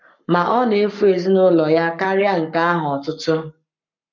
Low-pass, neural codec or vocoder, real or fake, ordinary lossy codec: 7.2 kHz; codec, 44.1 kHz, 7.8 kbps, Pupu-Codec; fake; none